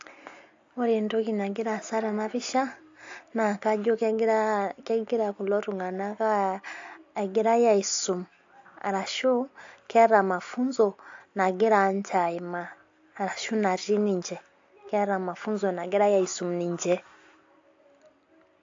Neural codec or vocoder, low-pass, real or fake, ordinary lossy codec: none; 7.2 kHz; real; AAC, 48 kbps